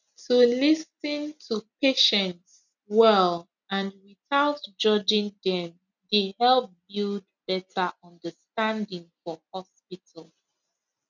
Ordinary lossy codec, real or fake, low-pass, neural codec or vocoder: none; real; 7.2 kHz; none